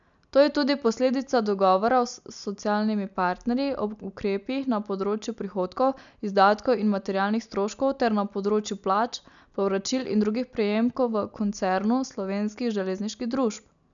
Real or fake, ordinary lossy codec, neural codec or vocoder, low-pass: real; none; none; 7.2 kHz